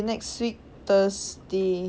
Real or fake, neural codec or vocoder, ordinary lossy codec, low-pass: real; none; none; none